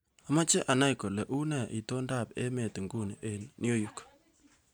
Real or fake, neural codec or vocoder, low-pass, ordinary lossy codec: real; none; none; none